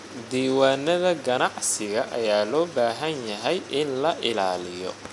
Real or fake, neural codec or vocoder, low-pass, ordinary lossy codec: real; none; 10.8 kHz; none